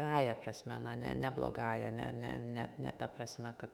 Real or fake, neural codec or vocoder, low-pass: fake; autoencoder, 48 kHz, 32 numbers a frame, DAC-VAE, trained on Japanese speech; 19.8 kHz